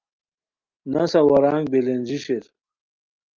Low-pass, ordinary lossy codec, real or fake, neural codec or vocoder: 7.2 kHz; Opus, 24 kbps; real; none